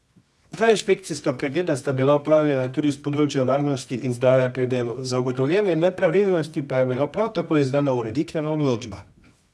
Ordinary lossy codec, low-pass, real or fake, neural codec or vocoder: none; none; fake; codec, 24 kHz, 0.9 kbps, WavTokenizer, medium music audio release